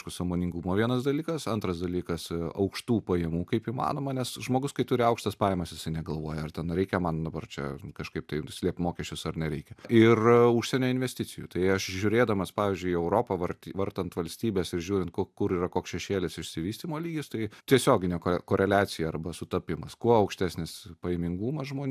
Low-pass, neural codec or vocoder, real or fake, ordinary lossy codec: 14.4 kHz; none; real; AAC, 96 kbps